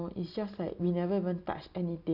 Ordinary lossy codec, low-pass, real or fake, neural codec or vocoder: none; 5.4 kHz; real; none